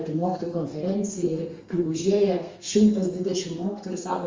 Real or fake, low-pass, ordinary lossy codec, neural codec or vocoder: fake; 7.2 kHz; Opus, 32 kbps; codec, 32 kHz, 1.9 kbps, SNAC